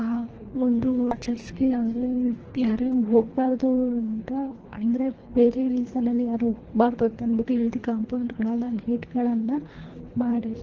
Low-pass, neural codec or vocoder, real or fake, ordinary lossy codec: 7.2 kHz; codec, 24 kHz, 3 kbps, HILCodec; fake; Opus, 16 kbps